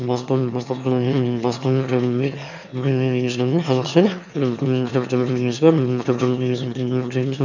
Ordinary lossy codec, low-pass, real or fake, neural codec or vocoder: none; 7.2 kHz; fake; autoencoder, 22.05 kHz, a latent of 192 numbers a frame, VITS, trained on one speaker